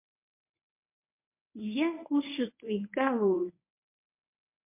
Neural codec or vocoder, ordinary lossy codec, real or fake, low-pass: codec, 24 kHz, 0.9 kbps, WavTokenizer, medium speech release version 1; MP3, 32 kbps; fake; 3.6 kHz